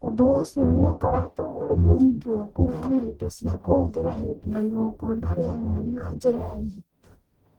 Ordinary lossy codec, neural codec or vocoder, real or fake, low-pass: Opus, 24 kbps; codec, 44.1 kHz, 0.9 kbps, DAC; fake; 19.8 kHz